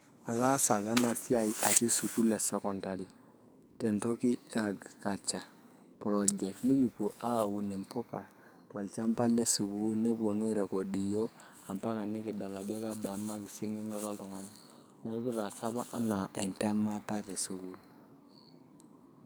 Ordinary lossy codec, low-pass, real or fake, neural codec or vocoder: none; none; fake; codec, 44.1 kHz, 2.6 kbps, SNAC